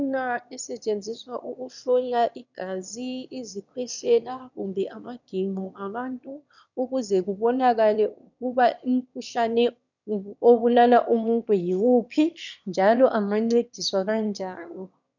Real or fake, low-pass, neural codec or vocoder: fake; 7.2 kHz; autoencoder, 22.05 kHz, a latent of 192 numbers a frame, VITS, trained on one speaker